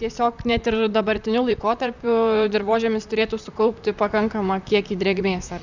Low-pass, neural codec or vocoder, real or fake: 7.2 kHz; vocoder, 44.1 kHz, 128 mel bands, Pupu-Vocoder; fake